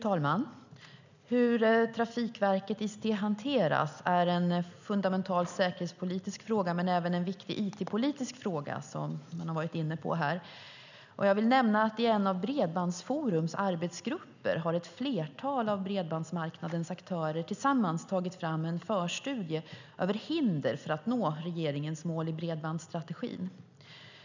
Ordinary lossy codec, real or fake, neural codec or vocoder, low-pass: none; real; none; 7.2 kHz